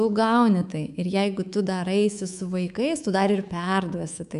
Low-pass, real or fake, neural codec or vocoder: 10.8 kHz; fake; codec, 24 kHz, 3.1 kbps, DualCodec